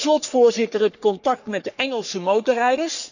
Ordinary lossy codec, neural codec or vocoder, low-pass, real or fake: none; codec, 44.1 kHz, 3.4 kbps, Pupu-Codec; 7.2 kHz; fake